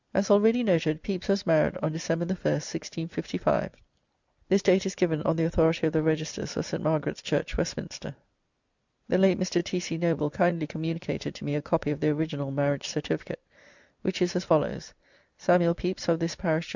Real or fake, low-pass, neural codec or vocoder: real; 7.2 kHz; none